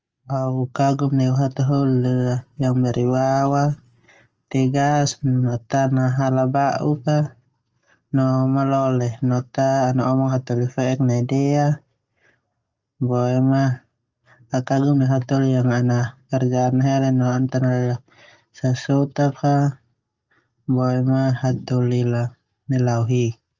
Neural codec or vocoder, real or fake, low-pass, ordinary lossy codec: none; real; 7.2 kHz; Opus, 24 kbps